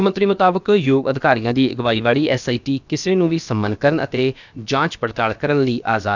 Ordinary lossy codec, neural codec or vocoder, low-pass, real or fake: none; codec, 16 kHz, about 1 kbps, DyCAST, with the encoder's durations; 7.2 kHz; fake